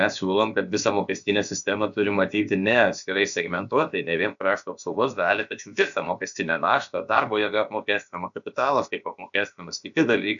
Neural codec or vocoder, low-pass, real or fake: codec, 16 kHz, about 1 kbps, DyCAST, with the encoder's durations; 7.2 kHz; fake